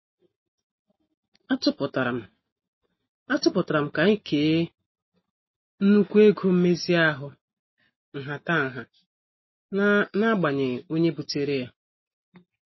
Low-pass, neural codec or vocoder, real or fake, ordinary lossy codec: 7.2 kHz; none; real; MP3, 24 kbps